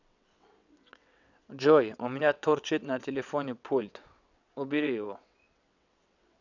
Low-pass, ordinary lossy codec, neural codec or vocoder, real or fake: 7.2 kHz; none; vocoder, 22.05 kHz, 80 mel bands, WaveNeXt; fake